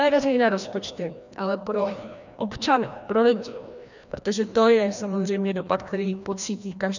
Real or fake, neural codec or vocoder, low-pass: fake; codec, 16 kHz, 1 kbps, FreqCodec, larger model; 7.2 kHz